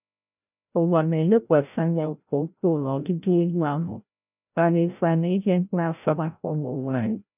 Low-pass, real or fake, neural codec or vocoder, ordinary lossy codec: 3.6 kHz; fake; codec, 16 kHz, 0.5 kbps, FreqCodec, larger model; none